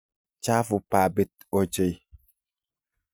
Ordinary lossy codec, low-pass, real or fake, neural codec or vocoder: none; none; real; none